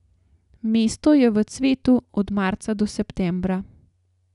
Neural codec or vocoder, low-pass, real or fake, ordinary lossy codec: none; 10.8 kHz; real; none